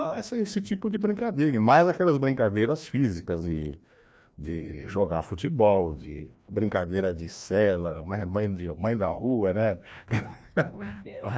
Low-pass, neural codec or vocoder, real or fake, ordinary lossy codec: none; codec, 16 kHz, 1 kbps, FreqCodec, larger model; fake; none